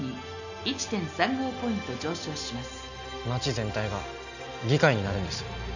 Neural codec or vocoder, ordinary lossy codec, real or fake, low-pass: none; none; real; 7.2 kHz